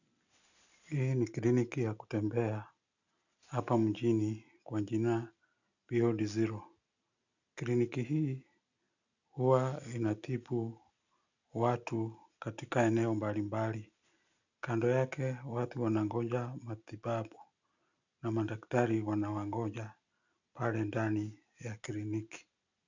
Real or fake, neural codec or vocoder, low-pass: real; none; 7.2 kHz